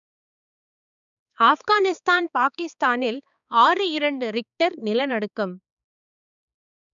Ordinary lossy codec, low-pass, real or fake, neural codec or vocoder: none; 7.2 kHz; fake; codec, 16 kHz, 4 kbps, X-Codec, HuBERT features, trained on balanced general audio